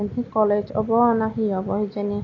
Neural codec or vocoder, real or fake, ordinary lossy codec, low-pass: none; real; AAC, 32 kbps; 7.2 kHz